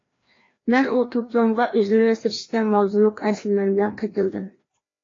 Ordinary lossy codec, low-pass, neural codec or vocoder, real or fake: AAC, 32 kbps; 7.2 kHz; codec, 16 kHz, 1 kbps, FreqCodec, larger model; fake